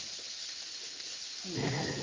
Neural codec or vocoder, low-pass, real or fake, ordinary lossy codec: codec, 16 kHz in and 24 kHz out, 1 kbps, XY-Tokenizer; 7.2 kHz; fake; Opus, 32 kbps